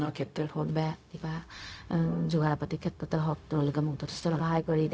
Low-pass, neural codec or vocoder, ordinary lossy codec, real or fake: none; codec, 16 kHz, 0.4 kbps, LongCat-Audio-Codec; none; fake